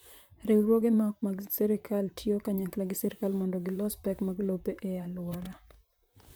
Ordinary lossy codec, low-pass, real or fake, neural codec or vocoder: none; none; fake; vocoder, 44.1 kHz, 128 mel bands, Pupu-Vocoder